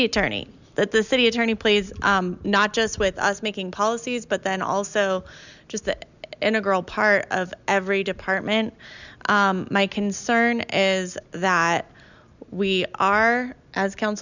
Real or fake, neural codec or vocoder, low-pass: real; none; 7.2 kHz